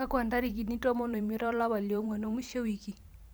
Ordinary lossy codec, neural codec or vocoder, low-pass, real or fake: none; vocoder, 44.1 kHz, 128 mel bands every 512 samples, BigVGAN v2; none; fake